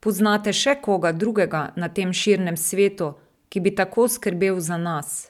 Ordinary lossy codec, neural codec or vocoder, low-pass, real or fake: none; none; 19.8 kHz; real